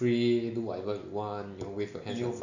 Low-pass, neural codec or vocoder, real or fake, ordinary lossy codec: 7.2 kHz; none; real; none